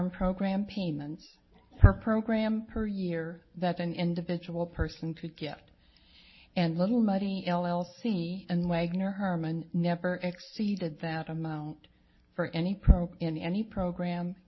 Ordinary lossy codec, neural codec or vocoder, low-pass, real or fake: MP3, 24 kbps; none; 7.2 kHz; real